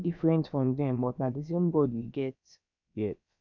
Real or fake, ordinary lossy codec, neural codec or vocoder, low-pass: fake; none; codec, 16 kHz, about 1 kbps, DyCAST, with the encoder's durations; 7.2 kHz